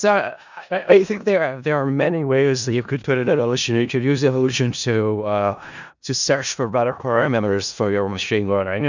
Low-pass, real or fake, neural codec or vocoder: 7.2 kHz; fake; codec, 16 kHz in and 24 kHz out, 0.4 kbps, LongCat-Audio-Codec, four codebook decoder